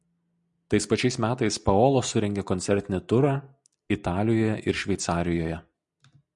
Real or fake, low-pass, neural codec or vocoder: real; 10.8 kHz; none